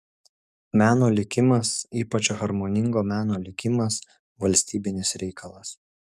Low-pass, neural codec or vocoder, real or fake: 14.4 kHz; codec, 44.1 kHz, 7.8 kbps, DAC; fake